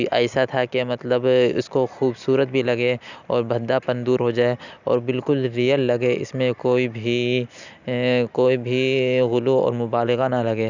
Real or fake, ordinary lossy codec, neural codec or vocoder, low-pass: real; none; none; 7.2 kHz